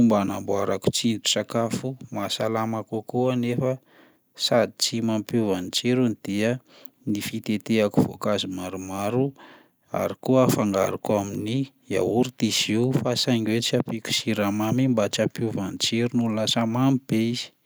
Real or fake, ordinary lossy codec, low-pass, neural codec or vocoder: real; none; none; none